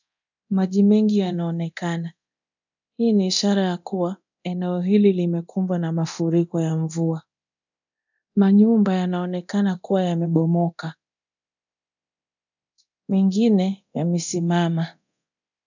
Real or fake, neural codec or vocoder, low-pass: fake; codec, 24 kHz, 0.9 kbps, DualCodec; 7.2 kHz